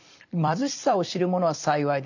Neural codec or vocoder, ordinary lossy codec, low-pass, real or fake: vocoder, 44.1 kHz, 128 mel bands every 256 samples, BigVGAN v2; none; 7.2 kHz; fake